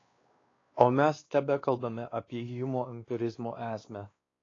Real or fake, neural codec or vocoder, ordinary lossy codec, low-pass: fake; codec, 16 kHz, 2 kbps, X-Codec, WavLM features, trained on Multilingual LibriSpeech; AAC, 32 kbps; 7.2 kHz